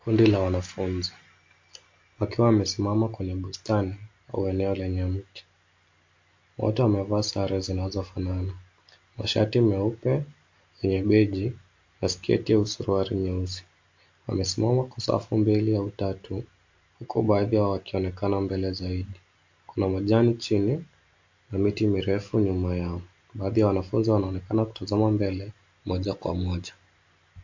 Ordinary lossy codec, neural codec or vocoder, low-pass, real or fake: MP3, 48 kbps; none; 7.2 kHz; real